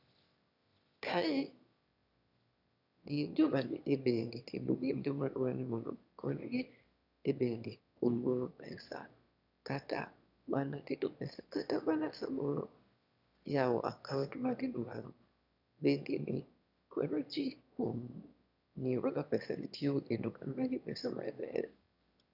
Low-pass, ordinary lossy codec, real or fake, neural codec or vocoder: 5.4 kHz; none; fake; autoencoder, 22.05 kHz, a latent of 192 numbers a frame, VITS, trained on one speaker